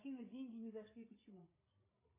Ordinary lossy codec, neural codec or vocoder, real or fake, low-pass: AAC, 24 kbps; codec, 16 kHz, 8 kbps, FreqCodec, larger model; fake; 3.6 kHz